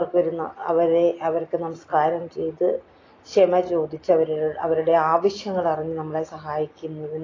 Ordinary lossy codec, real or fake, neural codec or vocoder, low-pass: AAC, 32 kbps; real; none; 7.2 kHz